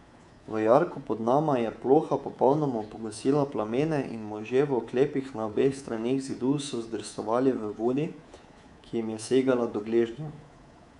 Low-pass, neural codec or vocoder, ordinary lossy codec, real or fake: 10.8 kHz; codec, 24 kHz, 3.1 kbps, DualCodec; none; fake